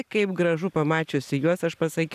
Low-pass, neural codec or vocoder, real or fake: 14.4 kHz; none; real